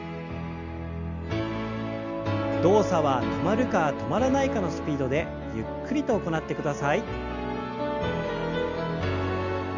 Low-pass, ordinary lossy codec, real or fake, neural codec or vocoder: 7.2 kHz; none; real; none